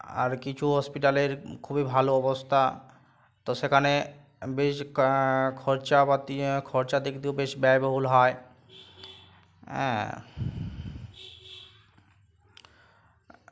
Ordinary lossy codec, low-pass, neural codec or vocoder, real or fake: none; none; none; real